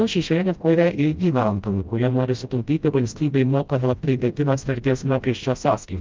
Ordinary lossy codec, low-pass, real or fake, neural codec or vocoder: Opus, 24 kbps; 7.2 kHz; fake; codec, 16 kHz, 0.5 kbps, FreqCodec, smaller model